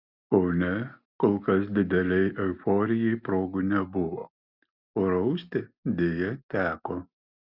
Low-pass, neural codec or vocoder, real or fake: 5.4 kHz; none; real